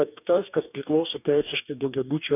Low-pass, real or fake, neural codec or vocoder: 3.6 kHz; fake; codec, 44.1 kHz, 2.6 kbps, DAC